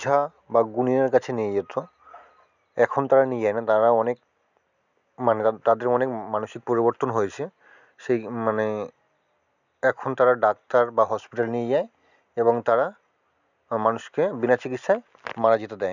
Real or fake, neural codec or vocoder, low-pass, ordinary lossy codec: real; none; 7.2 kHz; none